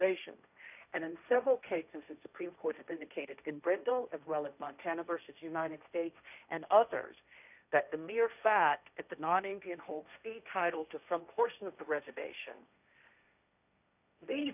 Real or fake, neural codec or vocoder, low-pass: fake; codec, 16 kHz, 1.1 kbps, Voila-Tokenizer; 3.6 kHz